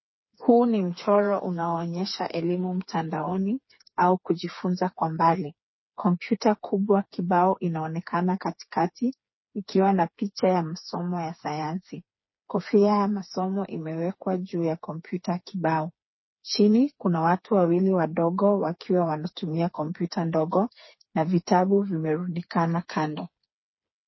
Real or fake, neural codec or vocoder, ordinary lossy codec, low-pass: fake; codec, 16 kHz, 4 kbps, FreqCodec, smaller model; MP3, 24 kbps; 7.2 kHz